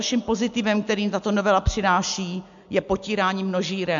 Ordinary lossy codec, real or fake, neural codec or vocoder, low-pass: MP3, 64 kbps; real; none; 7.2 kHz